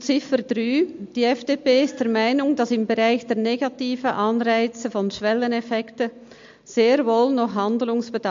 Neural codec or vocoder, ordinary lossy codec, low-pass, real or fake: none; MP3, 48 kbps; 7.2 kHz; real